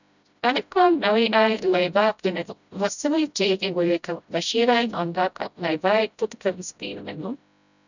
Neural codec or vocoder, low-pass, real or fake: codec, 16 kHz, 0.5 kbps, FreqCodec, smaller model; 7.2 kHz; fake